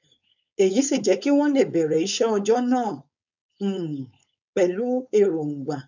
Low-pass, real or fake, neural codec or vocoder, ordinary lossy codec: 7.2 kHz; fake; codec, 16 kHz, 4.8 kbps, FACodec; none